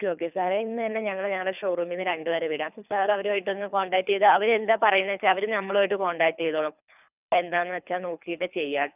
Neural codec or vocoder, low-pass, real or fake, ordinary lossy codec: codec, 24 kHz, 3 kbps, HILCodec; 3.6 kHz; fake; none